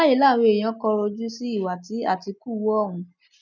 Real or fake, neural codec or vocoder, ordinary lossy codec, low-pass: real; none; none; 7.2 kHz